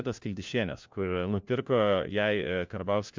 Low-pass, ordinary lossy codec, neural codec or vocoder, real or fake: 7.2 kHz; MP3, 64 kbps; codec, 16 kHz, 1 kbps, FunCodec, trained on LibriTTS, 50 frames a second; fake